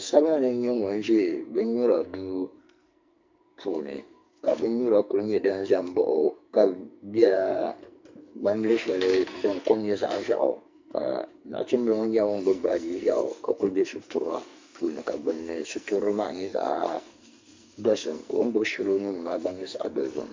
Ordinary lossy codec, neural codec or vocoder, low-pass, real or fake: MP3, 64 kbps; codec, 44.1 kHz, 2.6 kbps, SNAC; 7.2 kHz; fake